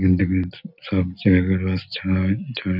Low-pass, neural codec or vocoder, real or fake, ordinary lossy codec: 5.4 kHz; none; real; none